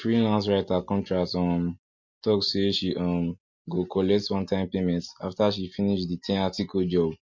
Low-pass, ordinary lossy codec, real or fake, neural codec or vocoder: 7.2 kHz; MP3, 48 kbps; real; none